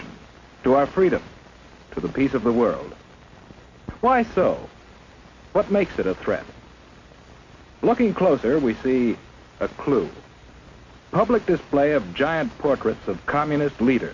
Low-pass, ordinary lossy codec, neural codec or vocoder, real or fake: 7.2 kHz; MP3, 48 kbps; none; real